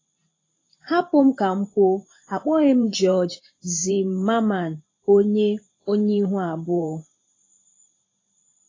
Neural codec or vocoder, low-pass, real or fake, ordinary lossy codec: vocoder, 44.1 kHz, 128 mel bands every 512 samples, BigVGAN v2; 7.2 kHz; fake; AAC, 32 kbps